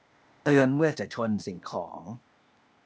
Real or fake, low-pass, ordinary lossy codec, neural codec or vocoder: fake; none; none; codec, 16 kHz, 0.8 kbps, ZipCodec